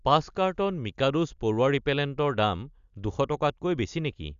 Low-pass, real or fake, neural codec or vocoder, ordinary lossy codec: 7.2 kHz; real; none; Opus, 64 kbps